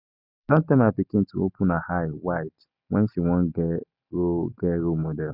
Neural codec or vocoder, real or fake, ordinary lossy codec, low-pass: none; real; none; 5.4 kHz